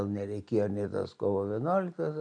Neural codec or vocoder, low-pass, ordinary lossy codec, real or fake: none; 9.9 kHz; AAC, 64 kbps; real